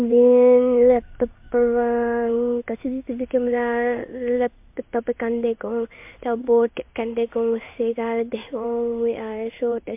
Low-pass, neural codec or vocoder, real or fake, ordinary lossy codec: 3.6 kHz; codec, 16 kHz in and 24 kHz out, 1 kbps, XY-Tokenizer; fake; MP3, 32 kbps